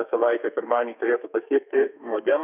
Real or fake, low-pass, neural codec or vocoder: fake; 3.6 kHz; codec, 32 kHz, 1.9 kbps, SNAC